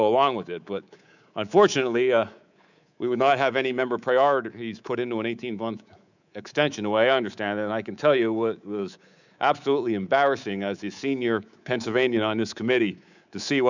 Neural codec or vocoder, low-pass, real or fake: codec, 24 kHz, 3.1 kbps, DualCodec; 7.2 kHz; fake